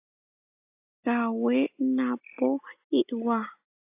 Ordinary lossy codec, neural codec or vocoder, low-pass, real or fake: AAC, 32 kbps; none; 3.6 kHz; real